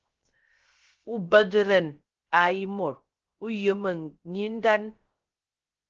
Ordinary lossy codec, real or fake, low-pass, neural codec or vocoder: Opus, 16 kbps; fake; 7.2 kHz; codec, 16 kHz, 0.3 kbps, FocalCodec